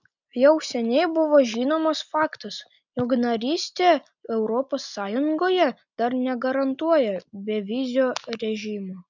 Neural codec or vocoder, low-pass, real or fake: none; 7.2 kHz; real